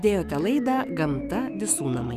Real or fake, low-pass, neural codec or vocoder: fake; 14.4 kHz; autoencoder, 48 kHz, 128 numbers a frame, DAC-VAE, trained on Japanese speech